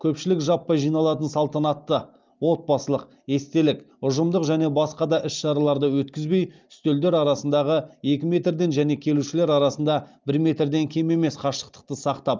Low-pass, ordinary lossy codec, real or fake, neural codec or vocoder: 7.2 kHz; Opus, 24 kbps; real; none